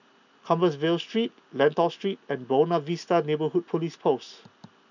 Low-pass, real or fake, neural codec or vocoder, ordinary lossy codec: 7.2 kHz; real; none; none